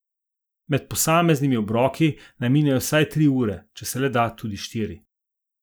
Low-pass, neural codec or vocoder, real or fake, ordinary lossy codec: none; none; real; none